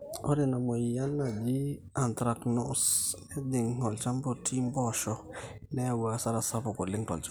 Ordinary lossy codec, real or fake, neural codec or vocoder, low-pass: none; real; none; none